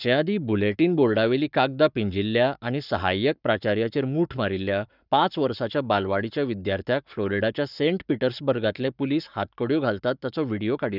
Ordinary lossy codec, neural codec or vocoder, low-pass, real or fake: none; codec, 44.1 kHz, 7.8 kbps, Pupu-Codec; 5.4 kHz; fake